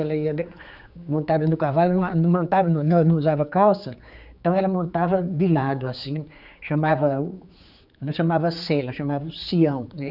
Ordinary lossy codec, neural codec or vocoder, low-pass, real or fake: none; codec, 16 kHz, 4 kbps, X-Codec, HuBERT features, trained on general audio; 5.4 kHz; fake